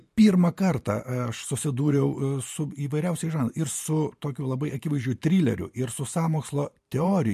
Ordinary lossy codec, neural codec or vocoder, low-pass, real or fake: MP3, 64 kbps; vocoder, 44.1 kHz, 128 mel bands every 512 samples, BigVGAN v2; 14.4 kHz; fake